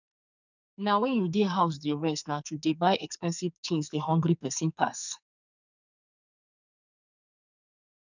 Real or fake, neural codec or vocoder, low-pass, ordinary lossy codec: fake; codec, 44.1 kHz, 2.6 kbps, SNAC; 7.2 kHz; none